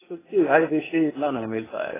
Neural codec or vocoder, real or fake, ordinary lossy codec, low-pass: codec, 16 kHz, 0.8 kbps, ZipCodec; fake; AAC, 16 kbps; 3.6 kHz